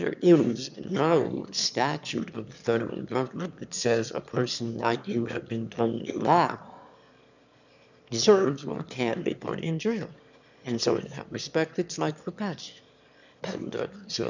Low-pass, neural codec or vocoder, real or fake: 7.2 kHz; autoencoder, 22.05 kHz, a latent of 192 numbers a frame, VITS, trained on one speaker; fake